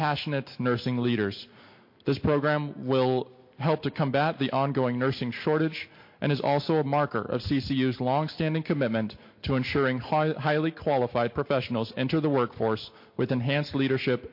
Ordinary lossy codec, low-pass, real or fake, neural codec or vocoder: MP3, 32 kbps; 5.4 kHz; real; none